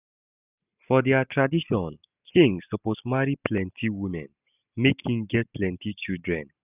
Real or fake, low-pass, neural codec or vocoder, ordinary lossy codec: real; 3.6 kHz; none; none